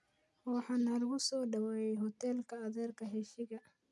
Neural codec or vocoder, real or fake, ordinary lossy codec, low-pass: none; real; none; none